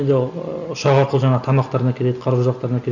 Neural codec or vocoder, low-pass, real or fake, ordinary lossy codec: none; 7.2 kHz; real; none